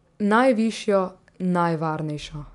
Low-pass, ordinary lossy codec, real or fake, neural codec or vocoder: 10.8 kHz; none; real; none